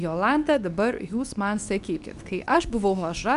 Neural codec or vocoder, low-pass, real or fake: codec, 24 kHz, 0.9 kbps, WavTokenizer, medium speech release version 2; 10.8 kHz; fake